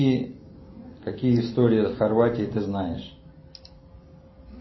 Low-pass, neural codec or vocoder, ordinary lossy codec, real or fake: 7.2 kHz; none; MP3, 24 kbps; real